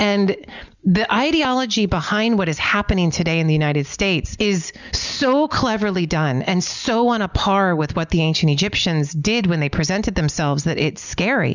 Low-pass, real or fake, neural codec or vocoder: 7.2 kHz; real; none